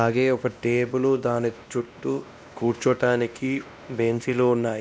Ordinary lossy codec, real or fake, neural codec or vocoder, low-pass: none; fake; codec, 16 kHz, 1 kbps, X-Codec, WavLM features, trained on Multilingual LibriSpeech; none